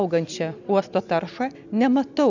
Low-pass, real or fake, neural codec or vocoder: 7.2 kHz; real; none